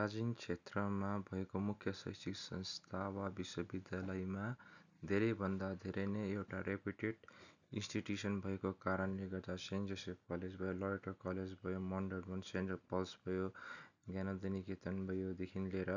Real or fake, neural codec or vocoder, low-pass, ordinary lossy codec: real; none; 7.2 kHz; none